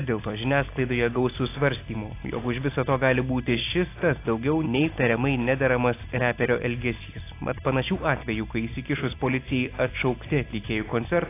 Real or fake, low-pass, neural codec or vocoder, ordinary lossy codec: real; 3.6 kHz; none; AAC, 24 kbps